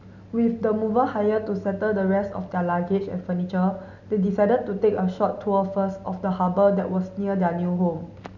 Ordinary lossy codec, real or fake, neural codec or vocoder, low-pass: none; real; none; 7.2 kHz